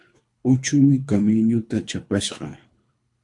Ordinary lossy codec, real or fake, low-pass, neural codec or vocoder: MP3, 64 kbps; fake; 10.8 kHz; codec, 24 kHz, 3 kbps, HILCodec